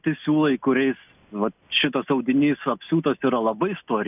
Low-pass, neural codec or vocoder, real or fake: 3.6 kHz; none; real